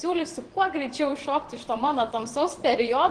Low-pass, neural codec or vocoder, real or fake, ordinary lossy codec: 9.9 kHz; vocoder, 22.05 kHz, 80 mel bands, WaveNeXt; fake; Opus, 16 kbps